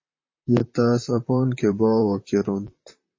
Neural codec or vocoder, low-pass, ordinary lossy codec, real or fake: vocoder, 44.1 kHz, 128 mel bands, Pupu-Vocoder; 7.2 kHz; MP3, 32 kbps; fake